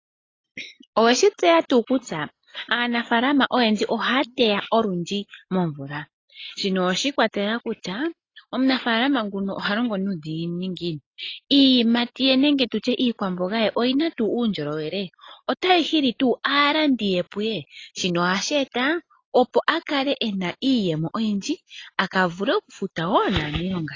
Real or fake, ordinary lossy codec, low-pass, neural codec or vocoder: real; AAC, 32 kbps; 7.2 kHz; none